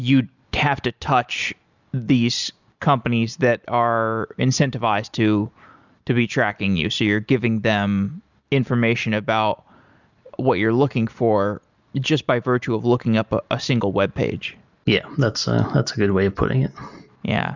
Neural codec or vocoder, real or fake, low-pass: none; real; 7.2 kHz